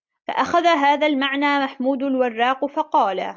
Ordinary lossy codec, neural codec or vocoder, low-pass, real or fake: MP3, 64 kbps; none; 7.2 kHz; real